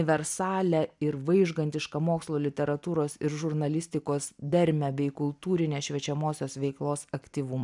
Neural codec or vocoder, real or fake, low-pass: none; real; 10.8 kHz